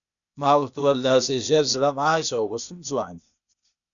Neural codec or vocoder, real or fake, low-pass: codec, 16 kHz, 0.8 kbps, ZipCodec; fake; 7.2 kHz